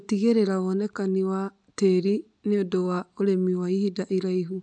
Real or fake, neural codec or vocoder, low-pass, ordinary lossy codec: real; none; 9.9 kHz; none